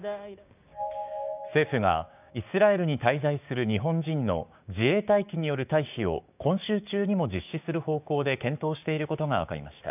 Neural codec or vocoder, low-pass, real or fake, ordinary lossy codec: codec, 16 kHz, 6 kbps, DAC; 3.6 kHz; fake; none